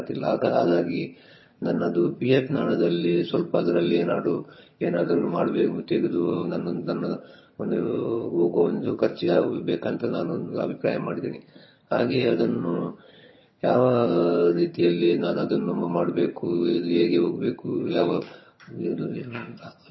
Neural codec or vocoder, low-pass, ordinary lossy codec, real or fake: vocoder, 22.05 kHz, 80 mel bands, HiFi-GAN; 7.2 kHz; MP3, 24 kbps; fake